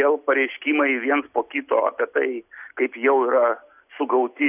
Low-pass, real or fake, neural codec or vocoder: 3.6 kHz; real; none